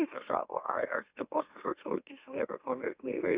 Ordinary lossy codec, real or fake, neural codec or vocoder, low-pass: Opus, 64 kbps; fake; autoencoder, 44.1 kHz, a latent of 192 numbers a frame, MeloTTS; 3.6 kHz